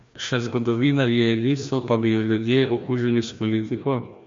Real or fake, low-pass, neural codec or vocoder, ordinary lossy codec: fake; 7.2 kHz; codec, 16 kHz, 1 kbps, FreqCodec, larger model; MP3, 48 kbps